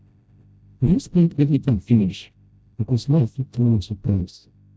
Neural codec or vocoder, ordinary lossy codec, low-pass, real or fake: codec, 16 kHz, 0.5 kbps, FreqCodec, smaller model; none; none; fake